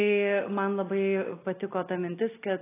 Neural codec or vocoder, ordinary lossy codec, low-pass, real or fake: none; AAC, 16 kbps; 3.6 kHz; real